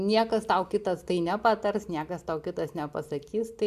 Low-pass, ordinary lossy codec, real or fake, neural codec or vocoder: 14.4 kHz; AAC, 96 kbps; real; none